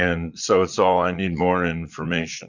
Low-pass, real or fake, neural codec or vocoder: 7.2 kHz; fake; vocoder, 22.05 kHz, 80 mel bands, Vocos